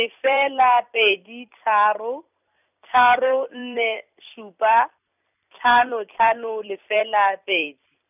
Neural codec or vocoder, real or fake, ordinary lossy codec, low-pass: vocoder, 44.1 kHz, 128 mel bands every 256 samples, BigVGAN v2; fake; none; 3.6 kHz